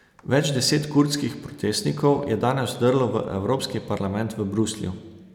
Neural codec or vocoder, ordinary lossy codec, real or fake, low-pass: none; none; real; 19.8 kHz